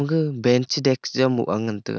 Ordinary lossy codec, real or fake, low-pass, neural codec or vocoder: none; real; none; none